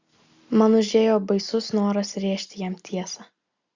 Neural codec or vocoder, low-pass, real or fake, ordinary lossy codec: none; 7.2 kHz; real; Opus, 64 kbps